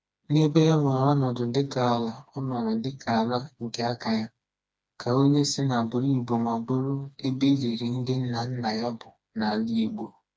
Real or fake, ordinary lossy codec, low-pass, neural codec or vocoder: fake; none; none; codec, 16 kHz, 2 kbps, FreqCodec, smaller model